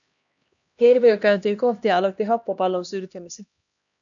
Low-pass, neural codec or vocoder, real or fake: 7.2 kHz; codec, 16 kHz, 1 kbps, X-Codec, HuBERT features, trained on LibriSpeech; fake